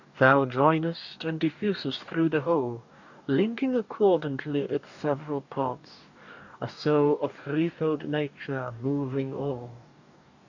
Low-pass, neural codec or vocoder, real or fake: 7.2 kHz; codec, 44.1 kHz, 2.6 kbps, DAC; fake